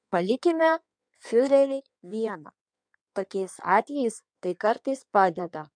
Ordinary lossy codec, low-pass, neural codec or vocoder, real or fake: MP3, 96 kbps; 9.9 kHz; codec, 16 kHz in and 24 kHz out, 1.1 kbps, FireRedTTS-2 codec; fake